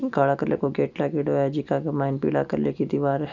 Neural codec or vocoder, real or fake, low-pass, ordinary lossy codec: none; real; 7.2 kHz; none